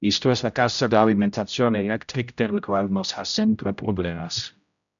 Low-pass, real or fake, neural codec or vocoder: 7.2 kHz; fake; codec, 16 kHz, 0.5 kbps, X-Codec, HuBERT features, trained on general audio